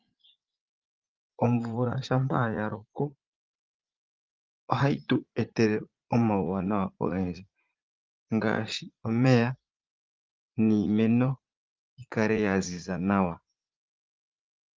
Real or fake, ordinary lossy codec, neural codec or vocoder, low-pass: fake; Opus, 24 kbps; vocoder, 44.1 kHz, 80 mel bands, Vocos; 7.2 kHz